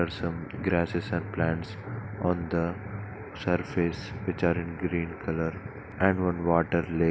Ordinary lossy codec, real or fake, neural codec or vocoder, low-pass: none; real; none; none